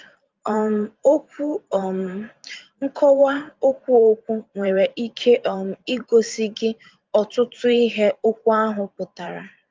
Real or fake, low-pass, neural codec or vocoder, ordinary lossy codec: fake; 7.2 kHz; vocoder, 44.1 kHz, 128 mel bands every 512 samples, BigVGAN v2; Opus, 32 kbps